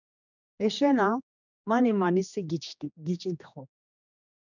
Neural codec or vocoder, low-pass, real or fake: codec, 16 kHz, 2 kbps, X-Codec, HuBERT features, trained on general audio; 7.2 kHz; fake